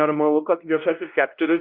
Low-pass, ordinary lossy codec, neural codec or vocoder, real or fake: 5.4 kHz; Opus, 32 kbps; codec, 16 kHz, 1 kbps, X-Codec, WavLM features, trained on Multilingual LibriSpeech; fake